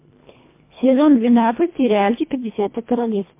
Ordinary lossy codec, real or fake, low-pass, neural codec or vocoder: MP3, 24 kbps; fake; 3.6 kHz; codec, 24 kHz, 1.5 kbps, HILCodec